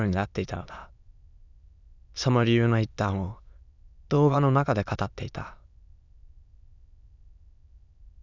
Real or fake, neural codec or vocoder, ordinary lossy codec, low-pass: fake; autoencoder, 22.05 kHz, a latent of 192 numbers a frame, VITS, trained on many speakers; none; 7.2 kHz